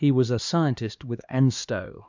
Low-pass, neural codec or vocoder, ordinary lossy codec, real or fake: 7.2 kHz; codec, 16 kHz, 2 kbps, X-Codec, HuBERT features, trained on LibriSpeech; MP3, 64 kbps; fake